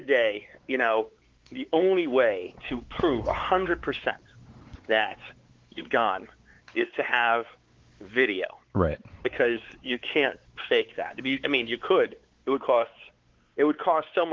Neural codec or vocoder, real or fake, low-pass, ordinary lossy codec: codec, 16 kHz, 4 kbps, X-Codec, WavLM features, trained on Multilingual LibriSpeech; fake; 7.2 kHz; Opus, 16 kbps